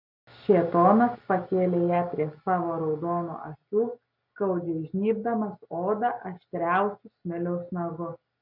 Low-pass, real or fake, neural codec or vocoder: 5.4 kHz; real; none